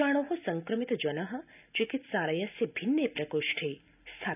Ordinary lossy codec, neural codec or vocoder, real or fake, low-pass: none; none; real; 3.6 kHz